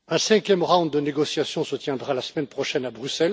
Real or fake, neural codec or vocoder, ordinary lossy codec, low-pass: real; none; none; none